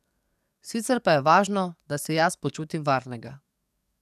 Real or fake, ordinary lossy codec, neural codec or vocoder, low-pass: fake; none; codec, 44.1 kHz, 7.8 kbps, DAC; 14.4 kHz